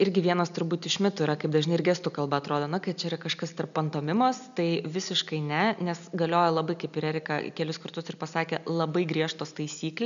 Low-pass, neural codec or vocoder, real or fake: 7.2 kHz; none; real